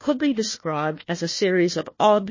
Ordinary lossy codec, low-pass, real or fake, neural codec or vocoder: MP3, 32 kbps; 7.2 kHz; fake; codec, 16 kHz, 1 kbps, FunCodec, trained on Chinese and English, 50 frames a second